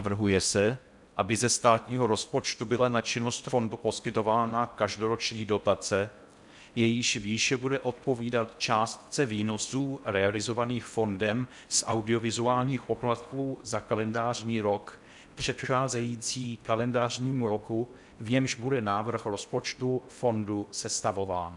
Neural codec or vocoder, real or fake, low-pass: codec, 16 kHz in and 24 kHz out, 0.6 kbps, FocalCodec, streaming, 4096 codes; fake; 10.8 kHz